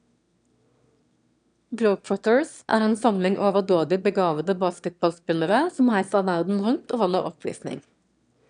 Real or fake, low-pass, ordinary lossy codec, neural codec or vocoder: fake; 9.9 kHz; none; autoencoder, 22.05 kHz, a latent of 192 numbers a frame, VITS, trained on one speaker